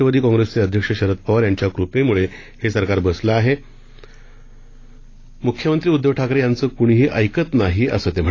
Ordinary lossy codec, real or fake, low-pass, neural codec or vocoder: AAC, 32 kbps; real; 7.2 kHz; none